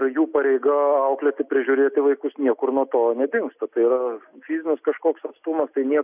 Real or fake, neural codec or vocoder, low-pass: real; none; 3.6 kHz